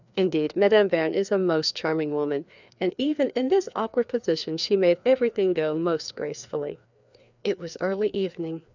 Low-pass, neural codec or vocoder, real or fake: 7.2 kHz; codec, 16 kHz, 2 kbps, FreqCodec, larger model; fake